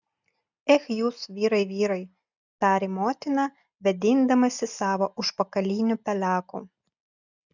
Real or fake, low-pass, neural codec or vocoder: real; 7.2 kHz; none